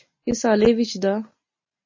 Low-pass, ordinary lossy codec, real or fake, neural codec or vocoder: 7.2 kHz; MP3, 32 kbps; real; none